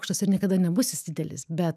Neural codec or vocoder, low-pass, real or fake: autoencoder, 48 kHz, 128 numbers a frame, DAC-VAE, trained on Japanese speech; 14.4 kHz; fake